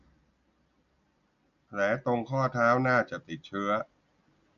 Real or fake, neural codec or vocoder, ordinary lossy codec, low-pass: real; none; none; 7.2 kHz